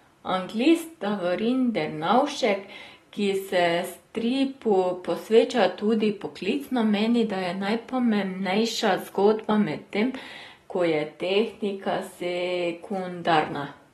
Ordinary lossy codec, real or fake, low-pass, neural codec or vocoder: AAC, 32 kbps; real; 19.8 kHz; none